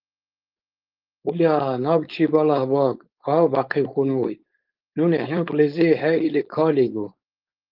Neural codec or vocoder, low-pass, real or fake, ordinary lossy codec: codec, 16 kHz, 4.8 kbps, FACodec; 5.4 kHz; fake; Opus, 32 kbps